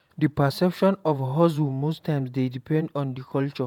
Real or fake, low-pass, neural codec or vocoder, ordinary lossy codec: fake; 19.8 kHz; vocoder, 48 kHz, 128 mel bands, Vocos; none